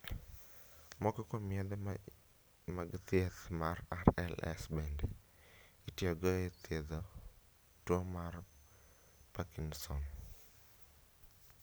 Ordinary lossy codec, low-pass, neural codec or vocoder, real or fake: none; none; none; real